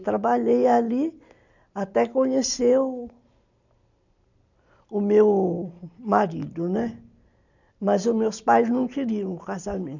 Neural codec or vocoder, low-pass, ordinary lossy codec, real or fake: none; 7.2 kHz; none; real